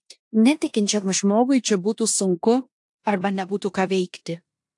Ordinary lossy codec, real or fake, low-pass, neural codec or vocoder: AAC, 64 kbps; fake; 10.8 kHz; codec, 16 kHz in and 24 kHz out, 0.9 kbps, LongCat-Audio-Codec, four codebook decoder